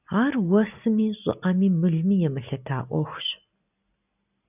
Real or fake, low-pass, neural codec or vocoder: real; 3.6 kHz; none